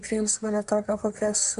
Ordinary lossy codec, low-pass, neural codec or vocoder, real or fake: MP3, 96 kbps; 10.8 kHz; codec, 24 kHz, 1 kbps, SNAC; fake